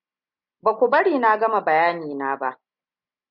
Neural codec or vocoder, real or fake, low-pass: none; real; 5.4 kHz